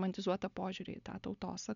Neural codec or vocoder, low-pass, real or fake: none; 7.2 kHz; real